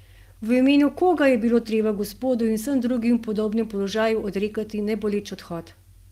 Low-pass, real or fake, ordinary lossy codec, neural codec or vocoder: 14.4 kHz; real; Opus, 24 kbps; none